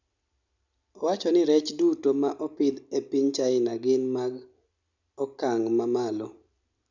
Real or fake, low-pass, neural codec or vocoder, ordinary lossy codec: real; 7.2 kHz; none; none